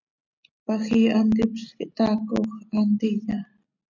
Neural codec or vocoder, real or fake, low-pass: none; real; 7.2 kHz